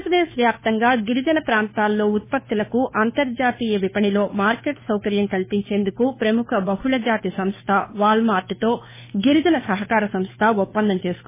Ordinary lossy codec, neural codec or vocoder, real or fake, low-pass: MP3, 16 kbps; codec, 16 kHz, 2 kbps, FunCodec, trained on Chinese and English, 25 frames a second; fake; 3.6 kHz